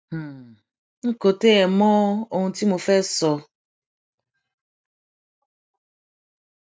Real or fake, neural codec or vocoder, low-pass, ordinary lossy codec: real; none; none; none